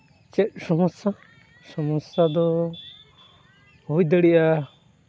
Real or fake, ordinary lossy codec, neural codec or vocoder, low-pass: real; none; none; none